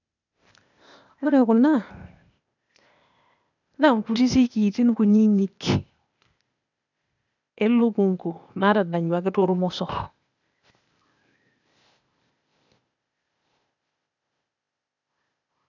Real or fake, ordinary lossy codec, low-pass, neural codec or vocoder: fake; none; 7.2 kHz; codec, 16 kHz, 0.8 kbps, ZipCodec